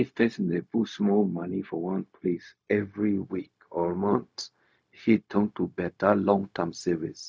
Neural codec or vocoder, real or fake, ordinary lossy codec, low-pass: codec, 16 kHz, 0.4 kbps, LongCat-Audio-Codec; fake; none; none